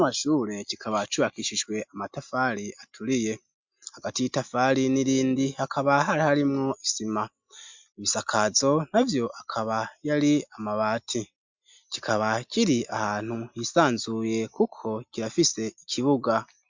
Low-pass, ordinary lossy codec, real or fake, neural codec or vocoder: 7.2 kHz; MP3, 64 kbps; real; none